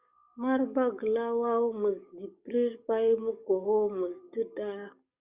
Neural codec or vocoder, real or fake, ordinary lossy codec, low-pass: codec, 44.1 kHz, 7.8 kbps, DAC; fake; Opus, 64 kbps; 3.6 kHz